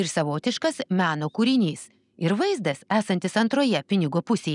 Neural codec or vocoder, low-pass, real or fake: none; 10.8 kHz; real